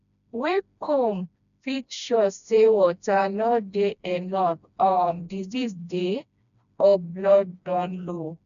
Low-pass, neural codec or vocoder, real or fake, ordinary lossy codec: 7.2 kHz; codec, 16 kHz, 1 kbps, FreqCodec, smaller model; fake; none